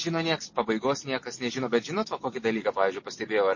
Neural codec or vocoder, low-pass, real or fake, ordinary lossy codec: none; 7.2 kHz; real; MP3, 32 kbps